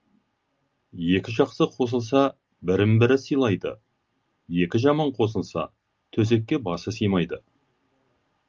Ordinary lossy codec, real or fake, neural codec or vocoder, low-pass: Opus, 24 kbps; real; none; 7.2 kHz